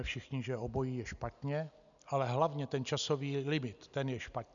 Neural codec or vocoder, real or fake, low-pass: none; real; 7.2 kHz